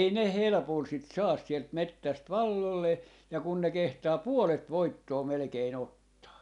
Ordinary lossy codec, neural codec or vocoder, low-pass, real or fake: none; none; 10.8 kHz; real